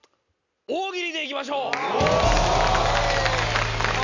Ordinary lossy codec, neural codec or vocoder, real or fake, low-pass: none; none; real; 7.2 kHz